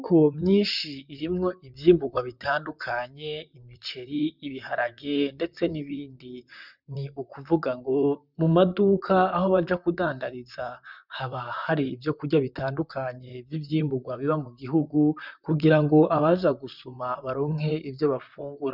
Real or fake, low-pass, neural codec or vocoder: fake; 5.4 kHz; vocoder, 44.1 kHz, 128 mel bands, Pupu-Vocoder